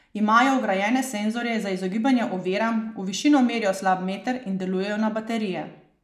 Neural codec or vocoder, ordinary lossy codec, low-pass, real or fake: none; none; 14.4 kHz; real